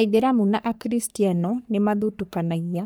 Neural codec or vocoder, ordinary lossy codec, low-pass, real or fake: codec, 44.1 kHz, 3.4 kbps, Pupu-Codec; none; none; fake